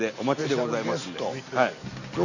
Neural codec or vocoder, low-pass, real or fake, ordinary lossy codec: none; 7.2 kHz; real; none